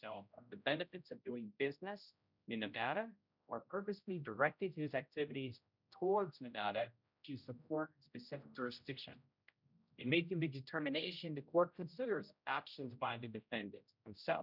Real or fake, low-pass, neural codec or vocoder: fake; 5.4 kHz; codec, 16 kHz, 0.5 kbps, X-Codec, HuBERT features, trained on general audio